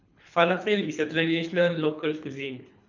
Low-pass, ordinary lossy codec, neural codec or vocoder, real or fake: 7.2 kHz; none; codec, 24 kHz, 3 kbps, HILCodec; fake